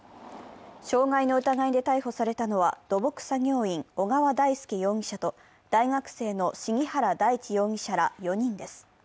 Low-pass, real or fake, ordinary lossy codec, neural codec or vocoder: none; real; none; none